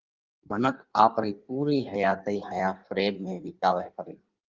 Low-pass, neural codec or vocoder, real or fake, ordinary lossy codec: 7.2 kHz; codec, 16 kHz in and 24 kHz out, 1.1 kbps, FireRedTTS-2 codec; fake; Opus, 32 kbps